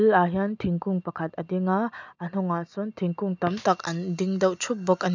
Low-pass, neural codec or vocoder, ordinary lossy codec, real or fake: 7.2 kHz; none; none; real